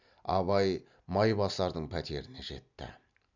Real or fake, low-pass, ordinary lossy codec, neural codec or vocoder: real; 7.2 kHz; none; none